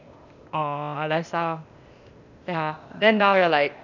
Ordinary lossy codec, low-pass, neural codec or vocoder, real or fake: none; 7.2 kHz; codec, 16 kHz, 0.8 kbps, ZipCodec; fake